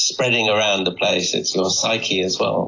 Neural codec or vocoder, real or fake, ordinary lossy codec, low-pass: none; real; AAC, 32 kbps; 7.2 kHz